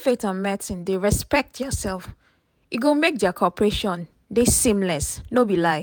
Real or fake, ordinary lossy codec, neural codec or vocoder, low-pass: fake; none; vocoder, 48 kHz, 128 mel bands, Vocos; none